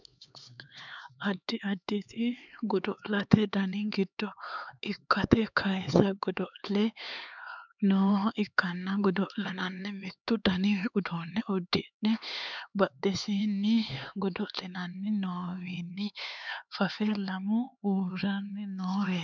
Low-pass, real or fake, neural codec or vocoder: 7.2 kHz; fake; codec, 16 kHz, 4 kbps, X-Codec, HuBERT features, trained on LibriSpeech